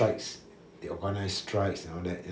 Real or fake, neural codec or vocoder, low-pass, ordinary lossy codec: real; none; none; none